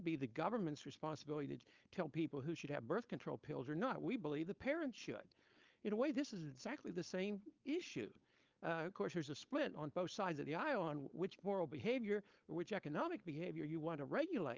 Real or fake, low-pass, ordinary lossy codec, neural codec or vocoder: fake; 7.2 kHz; Opus, 24 kbps; codec, 16 kHz, 4.8 kbps, FACodec